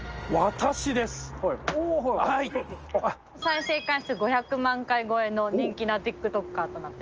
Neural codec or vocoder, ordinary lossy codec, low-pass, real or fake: none; Opus, 24 kbps; 7.2 kHz; real